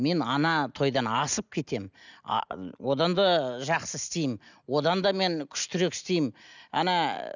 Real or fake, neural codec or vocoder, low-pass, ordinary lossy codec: real; none; 7.2 kHz; none